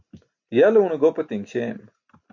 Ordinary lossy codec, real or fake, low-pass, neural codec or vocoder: MP3, 64 kbps; real; 7.2 kHz; none